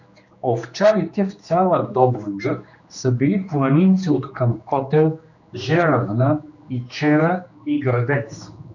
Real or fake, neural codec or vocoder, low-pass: fake; codec, 16 kHz, 2 kbps, X-Codec, HuBERT features, trained on general audio; 7.2 kHz